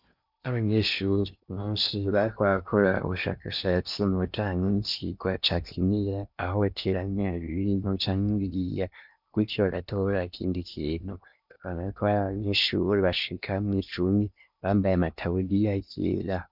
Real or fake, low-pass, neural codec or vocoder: fake; 5.4 kHz; codec, 16 kHz in and 24 kHz out, 0.8 kbps, FocalCodec, streaming, 65536 codes